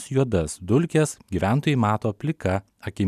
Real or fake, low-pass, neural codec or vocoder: real; 14.4 kHz; none